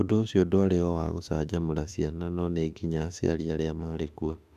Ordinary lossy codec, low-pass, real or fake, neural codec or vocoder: none; 14.4 kHz; fake; autoencoder, 48 kHz, 32 numbers a frame, DAC-VAE, trained on Japanese speech